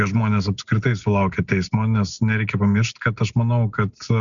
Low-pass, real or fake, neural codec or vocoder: 7.2 kHz; real; none